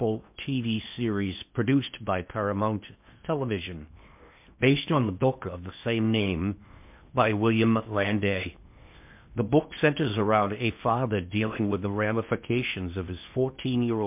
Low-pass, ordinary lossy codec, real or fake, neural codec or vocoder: 3.6 kHz; MP3, 32 kbps; fake; codec, 16 kHz in and 24 kHz out, 0.8 kbps, FocalCodec, streaming, 65536 codes